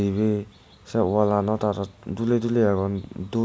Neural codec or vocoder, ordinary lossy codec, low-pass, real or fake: none; none; none; real